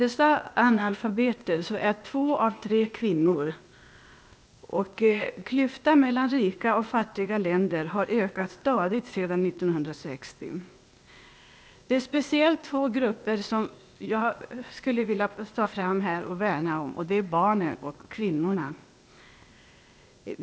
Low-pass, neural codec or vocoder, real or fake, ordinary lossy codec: none; codec, 16 kHz, 0.8 kbps, ZipCodec; fake; none